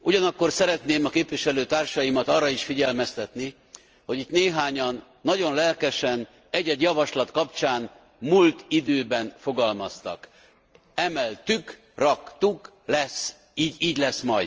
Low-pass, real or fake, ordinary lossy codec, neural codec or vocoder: 7.2 kHz; real; Opus, 24 kbps; none